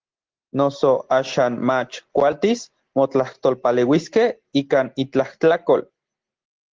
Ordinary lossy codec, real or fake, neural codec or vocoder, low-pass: Opus, 16 kbps; real; none; 7.2 kHz